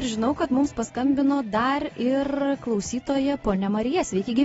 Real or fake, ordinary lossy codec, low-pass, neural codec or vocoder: real; AAC, 24 kbps; 19.8 kHz; none